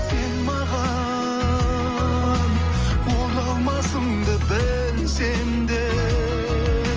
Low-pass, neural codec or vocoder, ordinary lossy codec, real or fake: 7.2 kHz; none; Opus, 24 kbps; real